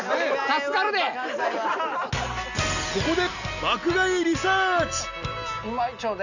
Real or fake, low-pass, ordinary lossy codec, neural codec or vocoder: real; 7.2 kHz; none; none